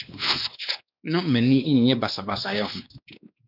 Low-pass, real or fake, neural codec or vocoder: 5.4 kHz; fake; codec, 16 kHz, 2 kbps, X-Codec, WavLM features, trained on Multilingual LibriSpeech